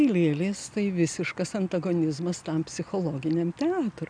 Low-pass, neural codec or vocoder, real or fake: 9.9 kHz; none; real